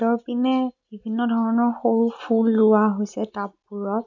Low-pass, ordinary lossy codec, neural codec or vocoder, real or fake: 7.2 kHz; none; none; real